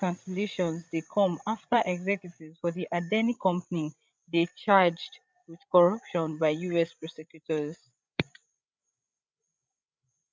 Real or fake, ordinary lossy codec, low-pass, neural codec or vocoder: fake; none; none; codec, 16 kHz, 16 kbps, FreqCodec, larger model